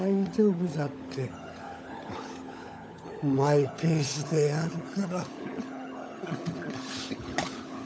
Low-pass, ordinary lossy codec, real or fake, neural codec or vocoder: none; none; fake; codec, 16 kHz, 16 kbps, FunCodec, trained on LibriTTS, 50 frames a second